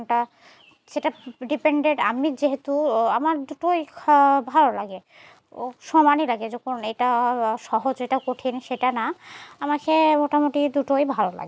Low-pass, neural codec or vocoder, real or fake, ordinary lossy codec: none; none; real; none